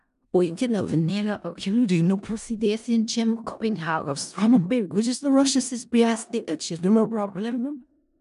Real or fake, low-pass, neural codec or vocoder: fake; 10.8 kHz; codec, 16 kHz in and 24 kHz out, 0.4 kbps, LongCat-Audio-Codec, four codebook decoder